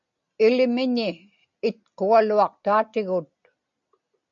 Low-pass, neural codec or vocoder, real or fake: 7.2 kHz; none; real